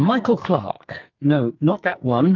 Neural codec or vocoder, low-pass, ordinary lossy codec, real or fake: codec, 44.1 kHz, 2.6 kbps, SNAC; 7.2 kHz; Opus, 24 kbps; fake